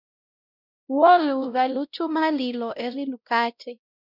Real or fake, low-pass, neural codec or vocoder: fake; 5.4 kHz; codec, 16 kHz, 0.5 kbps, X-Codec, WavLM features, trained on Multilingual LibriSpeech